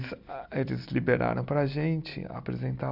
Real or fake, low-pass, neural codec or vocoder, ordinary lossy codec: real; 5.4 kHz; none; none